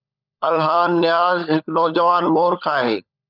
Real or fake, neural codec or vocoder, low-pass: fake; codec, 16 kHz, 16 kbps, FunCodec, trained on LibriTTS, 50 frames a second; 5.4 kHz